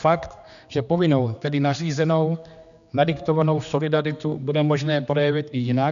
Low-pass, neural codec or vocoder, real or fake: 7.2 kHz; codec, 16 kHz, 2 kbps, X-Codec, HuBERT features, trained on general audio; fake